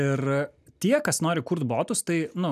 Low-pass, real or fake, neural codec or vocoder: 14.4 kHz; real; none